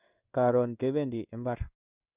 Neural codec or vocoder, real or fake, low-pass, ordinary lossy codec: codec, 16 kHz in and 24 kHz out, 1 kbps, XY-Tokenizer; fake; 3.6 kHz; Opus, 64 kbps